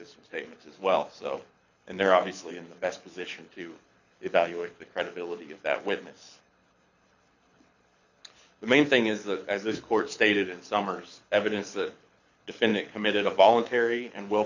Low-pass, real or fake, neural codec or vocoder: 7.2 kHz; fake; codec, 24 kHz, 6 kbps, HILCodec